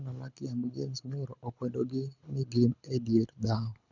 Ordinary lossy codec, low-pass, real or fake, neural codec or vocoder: none; 7.2 kHz; fake; codec, 24 kHz, 6 kbps, HILCodec